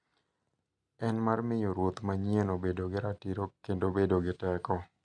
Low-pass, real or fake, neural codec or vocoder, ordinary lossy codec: 9.9 kHz; real; none; none